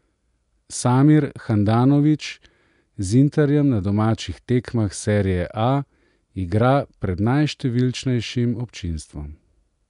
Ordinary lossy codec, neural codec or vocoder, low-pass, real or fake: none; none; 10.8 kHz; real